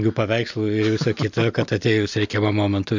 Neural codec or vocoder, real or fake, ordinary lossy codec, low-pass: none; real; MP3, 64 kbps; 7.2 kHz